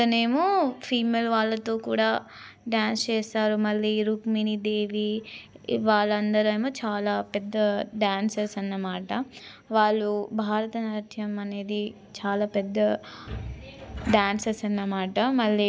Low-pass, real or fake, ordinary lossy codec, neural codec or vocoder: none; real; none; none